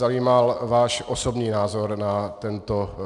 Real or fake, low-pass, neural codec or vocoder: real; 10.8 kHz; none